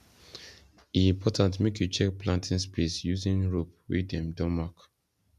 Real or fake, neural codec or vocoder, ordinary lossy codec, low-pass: real; none; none; 14.4 kHz